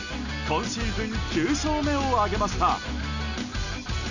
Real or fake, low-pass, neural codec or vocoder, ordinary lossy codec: real; 7.2 kHz; none; none